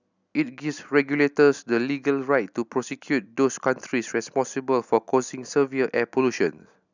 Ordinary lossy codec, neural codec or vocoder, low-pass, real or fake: none; none; 7.2 kHz; real